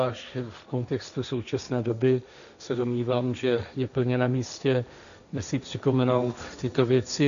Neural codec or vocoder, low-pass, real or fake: codec, 16 kHz, 1.1 kbps, Voila-Tokenizer; 7.2 kHz; fake